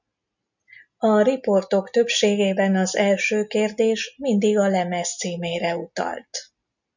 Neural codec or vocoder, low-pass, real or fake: none; 7.2 kHz; real